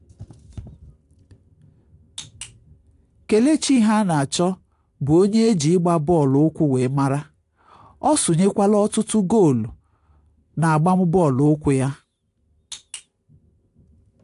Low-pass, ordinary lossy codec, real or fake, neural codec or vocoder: 10.8 kHz; AAC, 64 kbps; real; none